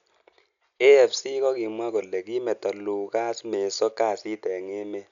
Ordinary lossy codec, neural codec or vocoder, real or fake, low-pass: none; none; real; 7.2 kHz